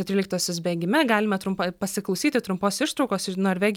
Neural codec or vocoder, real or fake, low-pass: none; real; 19.8 kHz